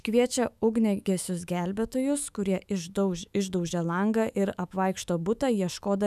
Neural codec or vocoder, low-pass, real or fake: autoencoder, 48 kHz, 128 numbers a frame, DAC-VAE, trained on Japanese speech; 14.4 kHz; fake